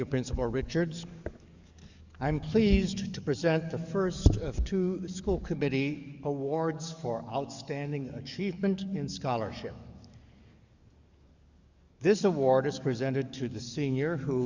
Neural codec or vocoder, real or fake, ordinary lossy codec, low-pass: codec, 44.1 kHz, 7.8 kbps, DAC; fake; Opus, 64 kbps; 7.2 kHz